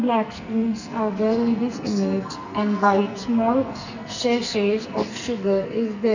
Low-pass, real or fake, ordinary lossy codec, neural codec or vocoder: 7.2 kHz; fake; none; codec, 44.1 kHz, 2.6 kbps, SNAC